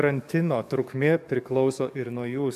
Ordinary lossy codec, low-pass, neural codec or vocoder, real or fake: AAC, 96 kbps; 14.4 kHz; codec, 44.1 kHz, 7.8 kbps, DAC; fake